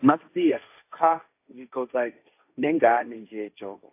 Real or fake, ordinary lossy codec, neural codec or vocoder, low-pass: fake; AAC, 24 kbps; codec, 16 kHz, 1.1 kbps, Voila-Tokenizer; 3.6 kHz